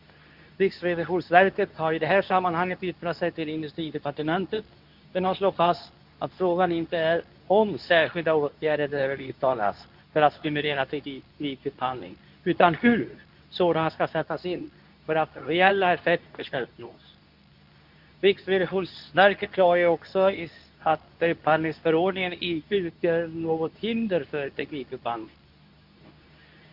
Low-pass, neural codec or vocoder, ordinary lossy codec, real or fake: 5.4 kHz; codec, 24 kHz, 0.9 kbps, WavTokenizer, medium speech release version 2; none; fake